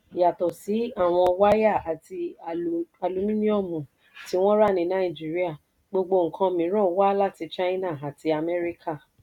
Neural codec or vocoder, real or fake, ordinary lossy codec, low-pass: none; real; none; 19.8 kHz